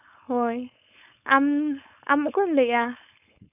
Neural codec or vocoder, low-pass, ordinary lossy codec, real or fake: codec, 16 kHz, 4.8 kbps, FACodec; 3.6 kHz; none; fake